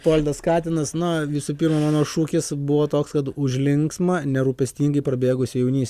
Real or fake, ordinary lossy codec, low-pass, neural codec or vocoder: real; Opus, 64 kbps; 14.4 kHz; none